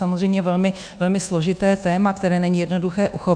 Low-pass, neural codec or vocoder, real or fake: 9.9 kHz; codec, 24 kHz, 1.2 kbps, DualCodec; fake